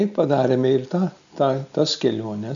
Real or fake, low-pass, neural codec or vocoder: real; 7.2 kHz; none